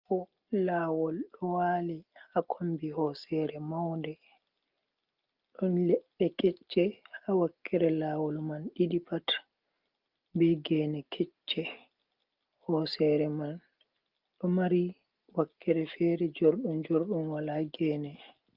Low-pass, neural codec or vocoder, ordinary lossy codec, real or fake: 5.4 kHz; none; Opus, 24 kbps; real